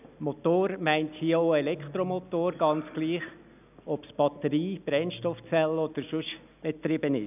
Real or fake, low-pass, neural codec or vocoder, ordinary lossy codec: real; 3.6 kHz; none; none